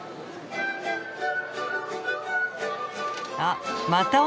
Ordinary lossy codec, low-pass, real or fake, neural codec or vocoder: none; none; real; none